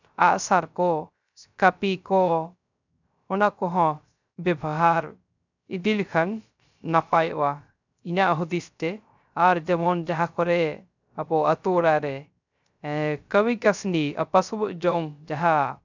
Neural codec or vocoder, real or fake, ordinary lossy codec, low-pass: codec, 16 kHz, 0.3 kbps, FocalCodec; fake; none; 7.2 kHz